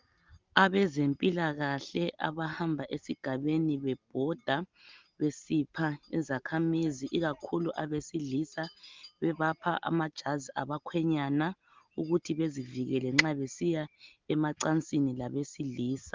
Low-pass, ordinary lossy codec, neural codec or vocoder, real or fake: 7.2 kHz; Opus, 32 kbps; none; real